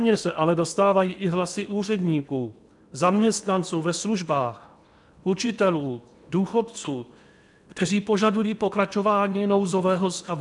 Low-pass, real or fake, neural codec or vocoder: 10.8 kHz; fake; codec, 16 kHz in and 24 kHz out, 0.8 kbps, FocalCodec, streaming, 65536 codes